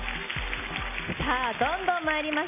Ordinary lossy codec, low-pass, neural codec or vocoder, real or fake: none; 3.6 kHz; none; real